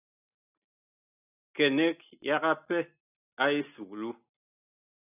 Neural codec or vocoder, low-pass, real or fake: none; 3.6 kHz; real